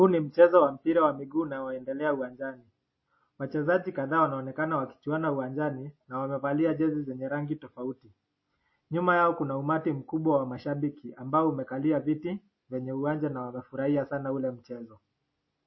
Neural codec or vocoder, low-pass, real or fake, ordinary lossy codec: none; 7.2 kHz; real; MP3, 24 kbps